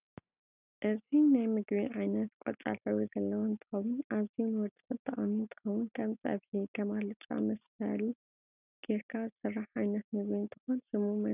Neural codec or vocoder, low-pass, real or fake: none; 3.6 kHz; real